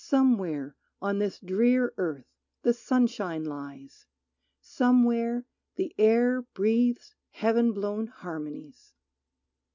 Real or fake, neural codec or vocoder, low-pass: real; none; 7.2 kHz